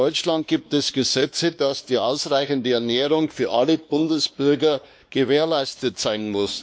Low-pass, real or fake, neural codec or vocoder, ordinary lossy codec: none; fake; codec, 16 kHz, 2 kbps, X-Codec, WavLM features, trained on Multilingual LibriSpeech; none